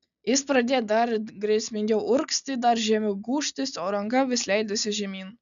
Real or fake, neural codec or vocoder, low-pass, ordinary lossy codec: real; none; 7.2 kHz; MP3, 96 kbps